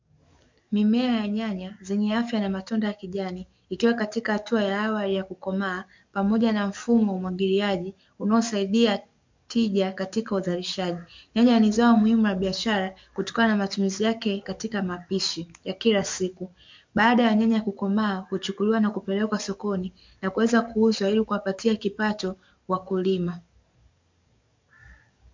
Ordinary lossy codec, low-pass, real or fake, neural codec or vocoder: MP3, 64 kbps; 7.2 kHz; fake; codec, 44.1 kHz, 7.8 kbps, DAC